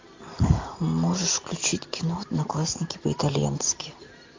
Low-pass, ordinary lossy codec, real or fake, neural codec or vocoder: 7.2 kHz; MP3, 48 kbps; real; none